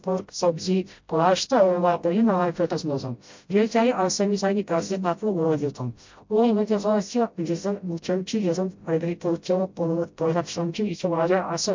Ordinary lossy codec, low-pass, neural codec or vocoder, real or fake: MP3, 48 kbps; 7.2 kHz; codec, 16 kHz, 0.5 kbps, FreqCodec, smaller model; fake